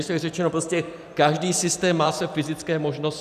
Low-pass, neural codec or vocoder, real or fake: 14.4 kHz; vocoder, 44.1 kHz, 128 mel bands every 512 samples, BigVGAN v2; fake